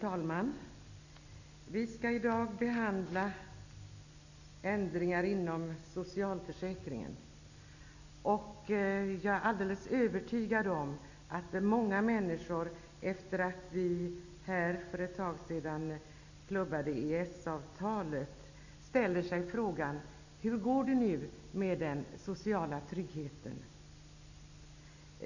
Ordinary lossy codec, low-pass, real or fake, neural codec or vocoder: none; 7.2 kHz; real; none